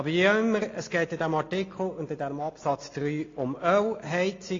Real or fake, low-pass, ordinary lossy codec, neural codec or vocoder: real; 7.2 kHz; AAC, 32 kbps; none